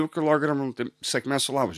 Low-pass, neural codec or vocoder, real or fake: 14.4 kHz; none; real